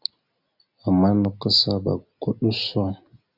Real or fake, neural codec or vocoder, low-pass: real; none; 5.4 kHz